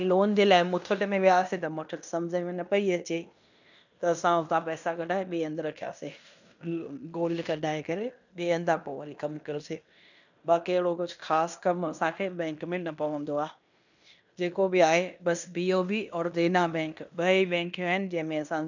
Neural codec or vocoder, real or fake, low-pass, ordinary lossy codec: codec, 16 kHz in and 24 kHz out, 0.9 kbps, LongCat-Audio-Codec, fine tuned four codebook decoder; fake; 7.2 kHz; none